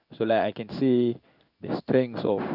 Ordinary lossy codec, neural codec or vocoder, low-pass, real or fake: none; codec, 16 kHz in and 24 kHz out, 1 kbps, XY-Tokenizer; 5.4 kHz; fake